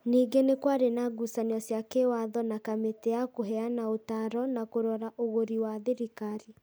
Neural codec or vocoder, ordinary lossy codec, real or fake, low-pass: none; none; real; none